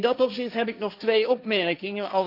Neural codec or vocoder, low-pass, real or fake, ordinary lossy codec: codec, 16 kHz, 1.1 kbps, Voila-Tokenizer; 5.4 kHz; fake; none